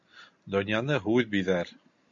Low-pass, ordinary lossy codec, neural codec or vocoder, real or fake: 7.2 kHz; MP3, 48 kbps; none; real